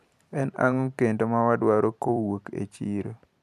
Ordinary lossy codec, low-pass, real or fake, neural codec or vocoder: none; 14.4 kHz; fake; vocoder, 44.1 kHz, 128 mel bands every 256 samples, BigVGAN v2